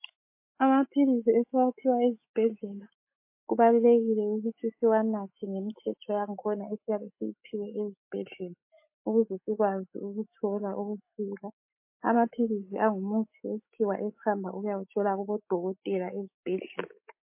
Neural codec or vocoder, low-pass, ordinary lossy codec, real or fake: autoencoder, 48 kHz, 128 numbers a frame, DAC-VAE, trained on Japanese speech; 3.6 kHz; MP3, 24 kbps; fake